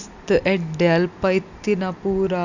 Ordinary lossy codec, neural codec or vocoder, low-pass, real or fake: none; none; 7.2 kHz; real